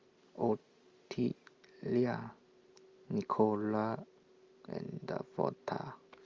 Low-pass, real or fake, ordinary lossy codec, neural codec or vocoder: 7.2 kHz; real; Opus, 32 kbps; none